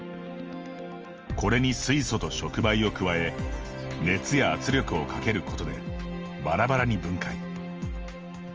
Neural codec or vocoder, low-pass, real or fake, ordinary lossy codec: none; 7.2 kHz; real; Opus, 24 kbps